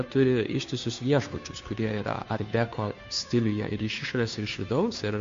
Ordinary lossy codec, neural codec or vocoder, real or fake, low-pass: MP3, 48 kbps; codec, 16 kHz, 2 kbps, FunCodec, trained on Chinese and English, 25 frames a second; fake; 7.2 kHz